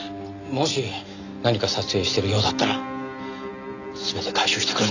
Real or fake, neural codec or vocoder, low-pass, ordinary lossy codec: real; none; 7.2 kHz; none